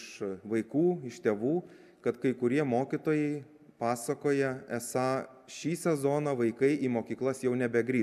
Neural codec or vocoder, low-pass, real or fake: none; 14.4 kHz; real